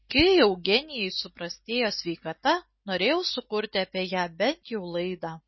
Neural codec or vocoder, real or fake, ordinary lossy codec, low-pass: none; real; MP3, 24 kbps; 7.2 kHz